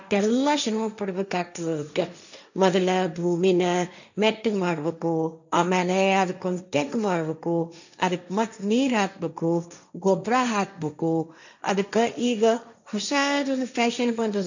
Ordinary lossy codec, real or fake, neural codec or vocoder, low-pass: none; fake; codec, 16 kHz, 1.1 kbps, Voila-Tokenizer; 7.2 kHz